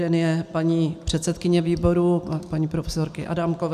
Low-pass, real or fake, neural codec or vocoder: 14.4 kHz; real; none